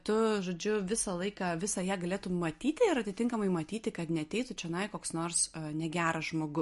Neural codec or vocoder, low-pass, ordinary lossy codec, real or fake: none; 14.4 kHz; MP3, 48 kbps; real